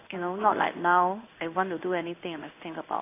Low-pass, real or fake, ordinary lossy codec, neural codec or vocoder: 3.6 kHz; fake; AAC, 24 kbps; codec, 16 kHz in and 24 kHz out, 1 kbps, XY-Tokenizer